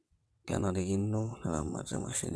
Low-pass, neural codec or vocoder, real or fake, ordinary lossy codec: none; vocoder, 22.05 kHz, 80 mel bands, WaveNeXt; fake; none